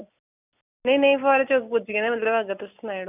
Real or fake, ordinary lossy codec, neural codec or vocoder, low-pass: real; none; none; 3.6 kHz